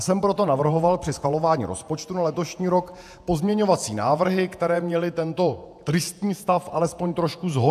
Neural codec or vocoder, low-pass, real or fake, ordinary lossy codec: vocoder, 48 kHz, 128 mel bands, Vocos; 14.4 kHz; fake; AAC, 96 kbps